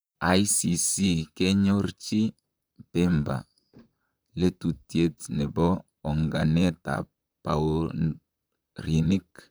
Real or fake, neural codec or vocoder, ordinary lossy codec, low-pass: fake; vocoder, 44.1 kHz, 128 mel bands, Pupu-Vocoder; none; none